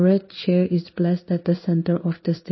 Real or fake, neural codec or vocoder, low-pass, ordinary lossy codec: fake; codec, 16 kHz in and 24 kHz out, 1 kbps, XY-Tokenizer; 7.2 kHz; MP3, 24 kbps